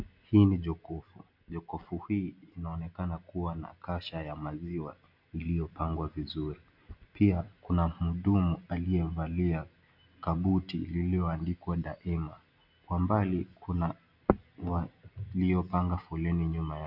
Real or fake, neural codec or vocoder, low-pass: real; none; 5.4 kHz